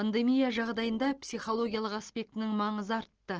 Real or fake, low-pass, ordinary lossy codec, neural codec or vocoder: real; 7.2 kHz; Opus, 16 kbps; none